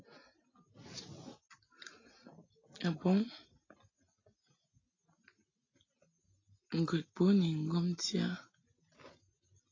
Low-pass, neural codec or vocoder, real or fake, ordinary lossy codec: 7.2 kHz; none; real; AAC, 32 kbps